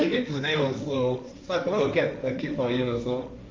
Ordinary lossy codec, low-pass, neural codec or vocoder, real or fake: none; none; codec, 16 kHz, 1.1 kbps, Voila-Tokenizer; fake